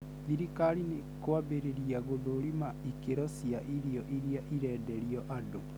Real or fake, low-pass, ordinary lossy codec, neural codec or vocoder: real; none; none; none